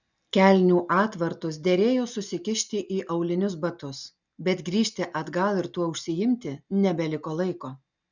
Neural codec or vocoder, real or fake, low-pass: none; real; 7.2 kHz